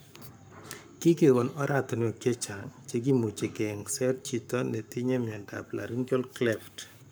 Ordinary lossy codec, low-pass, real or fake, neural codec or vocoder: none; none; fake; codec, 44.1 kHz, 7.8 kbps, Pupu-Codec